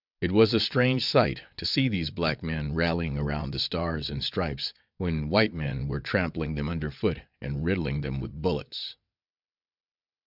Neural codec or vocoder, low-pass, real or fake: vocoder, 22.05 kHz, 80 mel bands, WaveNeXt; 5.4 kHz; fake